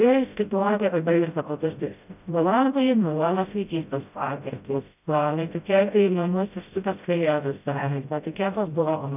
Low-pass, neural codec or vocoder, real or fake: 3.6 kHz; codec, 16 kHz, 0.5 kbps, FreqCodec, smaller model; fake